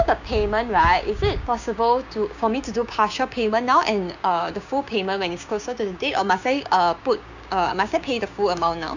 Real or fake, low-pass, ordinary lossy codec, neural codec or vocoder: fake; 7.2 kHz; none; codec, 16 kHz, 6 kbps, DAC